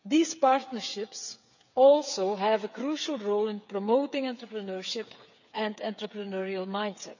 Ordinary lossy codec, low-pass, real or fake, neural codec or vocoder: none; 7.2 kHz; fake; codec, 16 kHz, 8 kbps, FreqCodec, smaller model